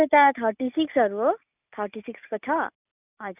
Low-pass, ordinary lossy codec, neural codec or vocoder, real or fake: 3.6 kHz; none; none; real